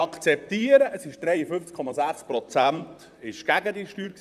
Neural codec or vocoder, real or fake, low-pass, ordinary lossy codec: vocoder, 44.1 kHz, 128 mel bands, Pupu-Vocoder; fake; 14.4 kHz; none